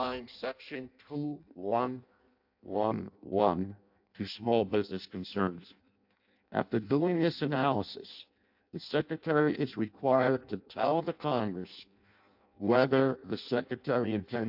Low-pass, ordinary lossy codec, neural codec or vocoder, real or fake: 5.4 kHz; AAC, 48 kbps; codec, 16 kHz in and 24 kHz out, 0.6 kbps, FireRedTTS-2 codec; fake